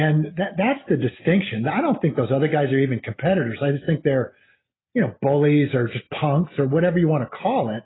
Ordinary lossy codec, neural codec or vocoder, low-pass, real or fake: AAC, 16 kbps; none; 7.2 kHz; real